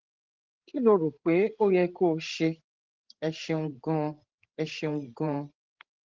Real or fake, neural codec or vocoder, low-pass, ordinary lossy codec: fake; codec, 16 kHz, 16 kbps, FreqCodec, larger model; 7.2 kHz; Opus, 16 kbps